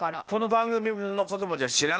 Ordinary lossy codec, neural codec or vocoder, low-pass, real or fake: none; codec, 16 kHz, 0.8 kbps, ZipCodec; none; fake